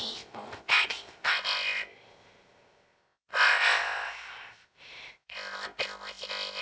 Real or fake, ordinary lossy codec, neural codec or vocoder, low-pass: fake; none; codec, 16 kHz, 0.3 kbps, FocalCodec; none